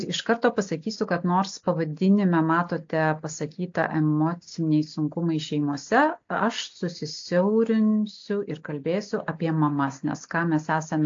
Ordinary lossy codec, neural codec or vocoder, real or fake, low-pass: AAC, 48 kbps; none; real; 7.2 kHz